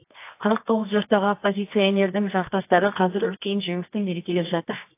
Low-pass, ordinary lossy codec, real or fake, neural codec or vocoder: 3.6 kHz; AAC, 24 kbps; fake; codec, 24 kHz, 0.9 kbps, WavTokenizer, medium music audio release